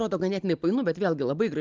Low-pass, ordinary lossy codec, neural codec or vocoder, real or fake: 7.2 kHz; Opus, 32 kbps; none; real